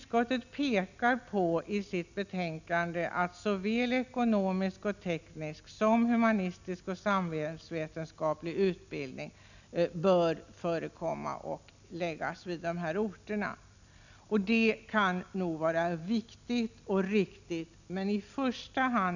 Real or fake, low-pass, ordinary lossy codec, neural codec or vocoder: real; 7.2 kHz; none; none